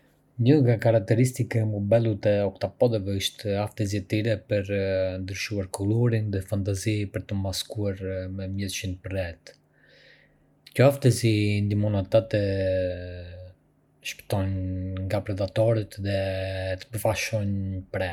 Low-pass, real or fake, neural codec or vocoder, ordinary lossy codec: 19.8 kHz; real; none; none